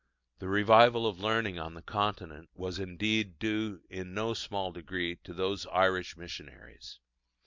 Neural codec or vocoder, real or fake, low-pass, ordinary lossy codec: none; real; 7.2 kHz; MP3, 64 kbps